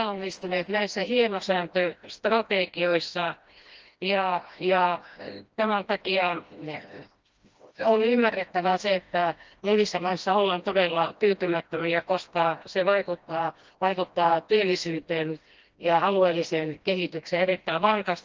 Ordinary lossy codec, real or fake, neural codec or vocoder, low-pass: Opus, 24 kbps; fake; codec, 16 kHz, 1 kbps, FreqCodec, smaller model; 7.2 kHz